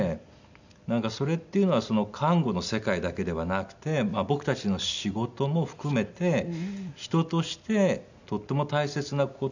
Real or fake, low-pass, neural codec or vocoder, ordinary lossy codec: real; 7.2 kHz; none; none